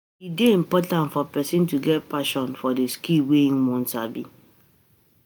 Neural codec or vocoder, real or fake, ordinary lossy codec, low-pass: none; real; none; none